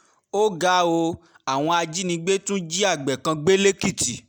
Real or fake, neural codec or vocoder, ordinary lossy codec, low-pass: real; none; none; none